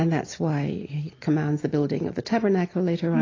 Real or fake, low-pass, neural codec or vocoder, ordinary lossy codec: real; 7.2 kHz; none; AAC, 32 kbps